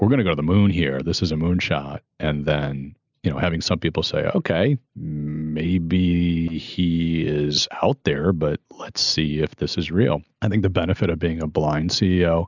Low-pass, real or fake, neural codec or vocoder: 7.2 kHz; real; none